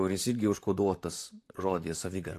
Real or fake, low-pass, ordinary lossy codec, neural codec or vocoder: fake; 14.4 kHz; AAC, 64 kbps; vocoder, 44.1 kHz, 128 mel bands, Pupu-Vocoder